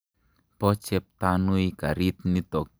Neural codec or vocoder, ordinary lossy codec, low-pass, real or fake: none; none; none; real